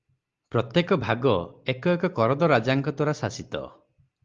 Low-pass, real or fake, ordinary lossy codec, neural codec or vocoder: 7.2 kHz; real; Opus, 32 kbps; none